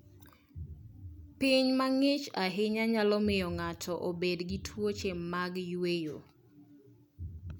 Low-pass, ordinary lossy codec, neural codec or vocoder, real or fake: none; none; none; real